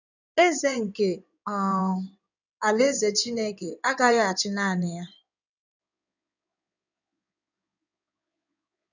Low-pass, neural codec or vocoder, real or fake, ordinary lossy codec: 7.2 kHz; codec, 16 kHz in and 24 kHz out, 2.2 kbps, FireRedTTS-2 codec; fake; none